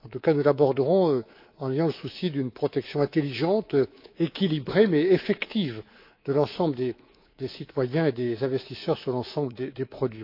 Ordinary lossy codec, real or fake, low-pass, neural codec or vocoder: AAC, 32 kbps; fake; 5.4 kHz; codec, 24 kHz, 3.1 kbps, DualCodec